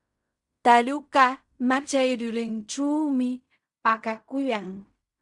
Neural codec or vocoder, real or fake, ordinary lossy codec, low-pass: codec, 16 kHz in and 24 kHz out, 0.4 kbps, LongCat-Audio-Codec, fine tuned four codebook decoder; fake; Opus, 64 kbps; 10.8 kHz